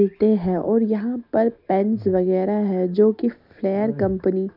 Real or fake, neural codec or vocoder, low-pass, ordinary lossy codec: real; none; 5.4 kHz; MP3, 48 kbps